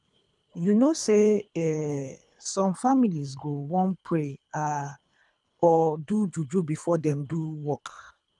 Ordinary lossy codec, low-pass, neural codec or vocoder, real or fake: none; 10.8 kHz; codec, 24 kHz, 3 kbps, HILCodec; fake